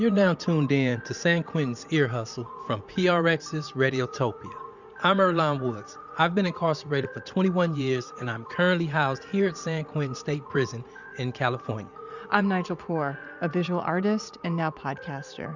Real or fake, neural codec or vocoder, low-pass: fake; vocoder, 44.1 kHz, 80 mel bands, Vocos; 7.2 kHz